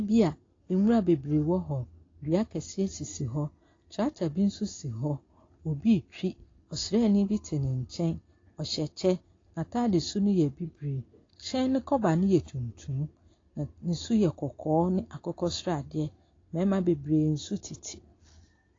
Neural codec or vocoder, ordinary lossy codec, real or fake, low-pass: none; AAC, 32 kbps; real; 7.2 kHz